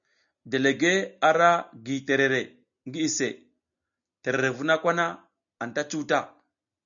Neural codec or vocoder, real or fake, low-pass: none; real; 7.2 kHz